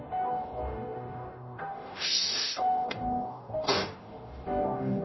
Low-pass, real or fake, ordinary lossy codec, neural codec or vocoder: 7.2 kHz; fake; MP3, 24 kbps; codec, 44.1 kHz, 0.9 kbps, DAC